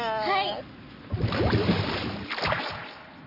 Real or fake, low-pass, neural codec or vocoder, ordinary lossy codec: real; 5.4 kHz; none; none